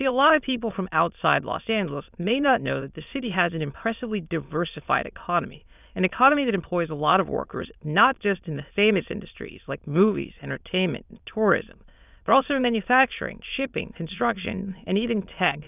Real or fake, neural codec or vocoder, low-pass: fake; autoencoder, 22.05 kHz, a latent of 192 numbers a frame, VITS, trained on many speakers; 3.6 kHz